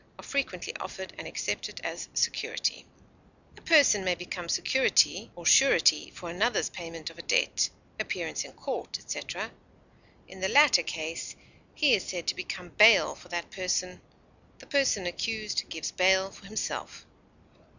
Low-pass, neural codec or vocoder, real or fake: 7.2 kHz; none; real